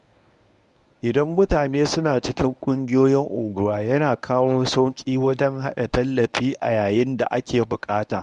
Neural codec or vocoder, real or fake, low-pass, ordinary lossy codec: codec, 24 kHz, 0.9 kbps, WavTokenizer, medium speech release version 1; fake; 10.8 kHz; none